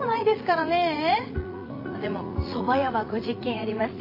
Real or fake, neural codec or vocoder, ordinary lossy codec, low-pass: fake; vocoder, 44.1 kHz, 80 mel bands, Vocos; AAC, 32 kbps; 5.4 kHz